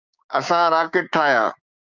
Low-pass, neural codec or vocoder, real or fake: 7.2 kHz; codec, 16 kHz, 6 kbps, DAC; fake